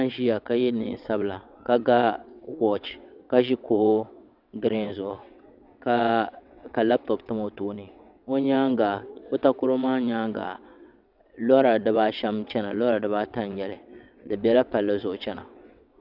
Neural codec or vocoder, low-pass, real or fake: vocoder, 22.05 kHz, 80 mel bands, WaveNeXt; 5.4 kHz; fake